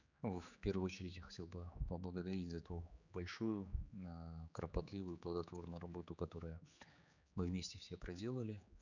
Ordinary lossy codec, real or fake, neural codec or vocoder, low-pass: none; fake; codec, 16 kHz, 4 kbps, X-Codec, HuBERT features, trained on general audio; 7.2 kHz